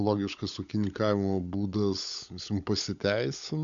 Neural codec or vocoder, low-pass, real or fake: codec, 16 kHz, 16 kbps, FunCodec, trained on LibriTTS, 50 frames a second; 7.2 kHz; fake